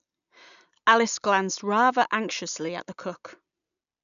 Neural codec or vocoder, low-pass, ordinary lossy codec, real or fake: none; 7.2 kHz; none; real